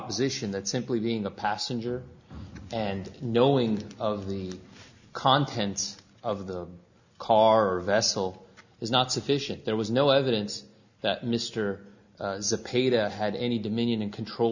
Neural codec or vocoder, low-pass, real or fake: none; 7.2 kHz; real